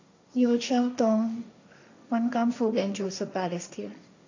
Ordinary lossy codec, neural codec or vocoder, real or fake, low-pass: AAC, 48 kbps; codec, 16 kHz, 1.1 kbps, Voila-Tokenizer; fake; 7.2 kHz